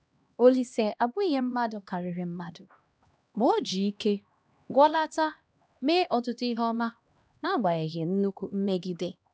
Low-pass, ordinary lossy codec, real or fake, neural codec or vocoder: none; none; fake; codec, 16 kHz, 1 kbps, X-Codec, HuBERT features, trained on LibriSpeech